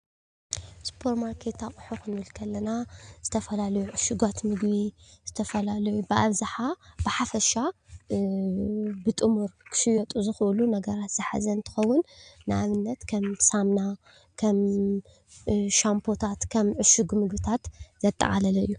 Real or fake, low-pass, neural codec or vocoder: real; 9.9 kHz; none